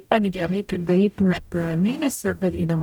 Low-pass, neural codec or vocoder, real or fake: 19.8 kHz; codec, 44.1 kHz, 0.9 kbps, DAC; fake